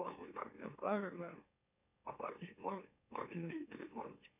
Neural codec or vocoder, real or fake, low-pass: autoencoder, 44.1 kHz, a latent of 192 numbers a frame, MeloTTS; fake; 3.6 kHz